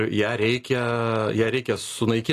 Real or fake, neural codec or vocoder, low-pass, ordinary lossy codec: real; none; 14.4 kHz; AAC, 48 kbps